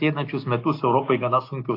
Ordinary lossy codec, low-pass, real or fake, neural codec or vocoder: AAC, 32 kbps; 5.4 kHz; real; none